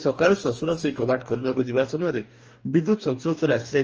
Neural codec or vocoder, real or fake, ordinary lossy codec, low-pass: codec, 44.1 kHz, 2.6 kbps, DAC; fake; Opus, 24 kbps; 7.2 kHz